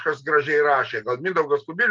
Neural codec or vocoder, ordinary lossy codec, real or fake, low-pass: none; Opus, 24 kbps; real; 7.2 kHz